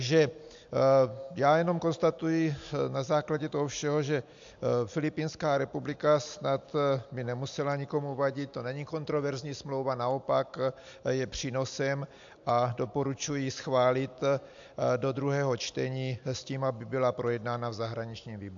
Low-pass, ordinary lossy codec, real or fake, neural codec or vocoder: 7.2 kHz; AAC, 64 kbps; real; none